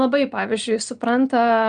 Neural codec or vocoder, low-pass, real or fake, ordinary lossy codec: none; 9.9 kHz; real; Opus, 32 kbps